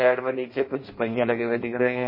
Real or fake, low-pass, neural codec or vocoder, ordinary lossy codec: fake; 5.4 kHz; codec, 16 kHz in and 24 kHz out, 0.6 kbps, FireRedTTS-2 codec; MP3, 24 kbps